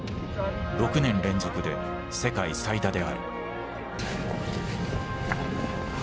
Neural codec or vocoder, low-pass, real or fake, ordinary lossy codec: none; none; real; none